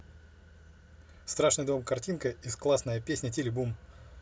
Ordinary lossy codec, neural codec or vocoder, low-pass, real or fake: none; none; none; real